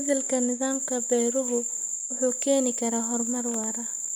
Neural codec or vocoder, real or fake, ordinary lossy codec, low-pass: none; real; none; none